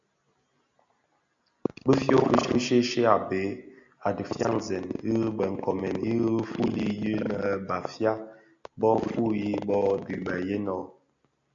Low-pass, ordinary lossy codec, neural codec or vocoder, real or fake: 7.2 kHz; AAC, 64 kbps; none; real